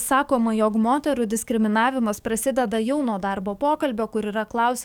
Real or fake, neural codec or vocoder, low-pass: fake; codec, 44.1 kHz, 7.8 kbps, DAC; 19.8 kHz